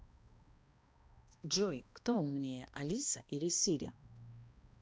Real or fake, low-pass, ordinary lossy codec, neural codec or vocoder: fake; none; none; codec, 16 kHz, 1 kbps, X-Codec, HuBERT features, trained on balanced general audio